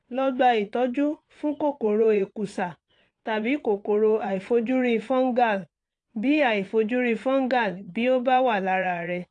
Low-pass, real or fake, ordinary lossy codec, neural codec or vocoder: 9.9 kHz; fake; AAC, 48 kbps; vocoder, 22.05 kHz, 80 mel bands, Vocos